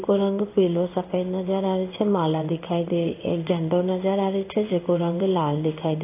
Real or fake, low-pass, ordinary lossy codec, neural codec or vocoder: fake; 3.6 kHz; AAC, 24 kbps; codec, 16 kHz in and 24 kHz out, 1 kbps, XY-Tokenizer